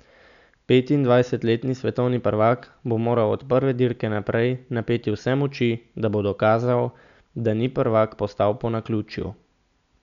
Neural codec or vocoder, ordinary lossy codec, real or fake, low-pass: none; none; real; 7.2 kHz